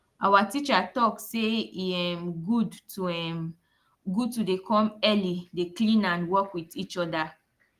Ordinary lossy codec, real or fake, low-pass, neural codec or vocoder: Opus, 24 kbps; real; 14.4 kHz; none